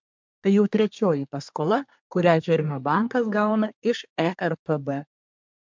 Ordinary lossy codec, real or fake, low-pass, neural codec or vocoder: MP3, 64 kbps; fake; 7.2 kHz; codec, 24 kHz, 1 kbps, SNAC